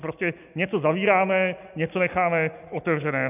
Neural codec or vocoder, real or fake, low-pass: none; real; 3.6 kHz